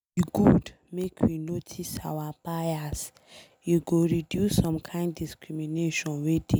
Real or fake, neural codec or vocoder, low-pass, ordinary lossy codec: real; none; none; none